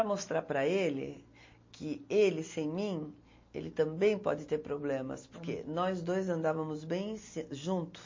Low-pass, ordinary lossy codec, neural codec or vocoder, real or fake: 7.2 kHz; MP3, 32 kbps; none; real